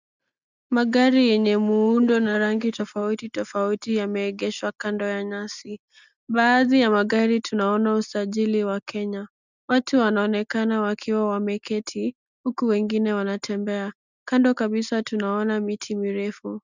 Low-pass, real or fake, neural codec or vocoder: 7.2 kHz; real; none